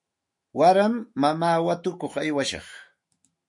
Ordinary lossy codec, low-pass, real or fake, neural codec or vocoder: MP3, 48 kbps; 10.8 kHz; fake; autoencoder, 48 kHz, 128 numbers a frame, DAC-VAE, trained on Japanese speech